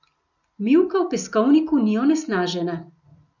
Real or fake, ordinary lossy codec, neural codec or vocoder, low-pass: real; none; none; 7.2 kHz